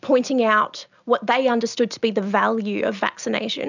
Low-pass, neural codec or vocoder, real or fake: 7.2 kHz; none; real